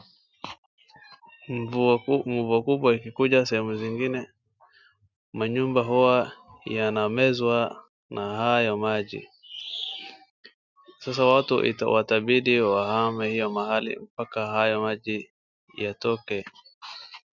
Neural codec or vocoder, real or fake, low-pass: none; real; 7.2 kHz